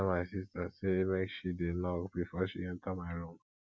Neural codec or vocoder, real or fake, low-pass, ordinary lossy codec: none; real; none; none